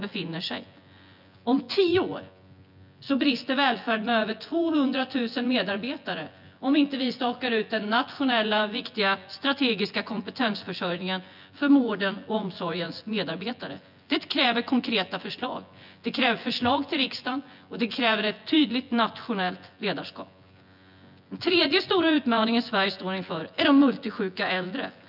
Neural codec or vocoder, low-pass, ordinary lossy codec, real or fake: vocoder, 24 kHz, 100 mel bands, Vocos; 5.4 kHz; none; fake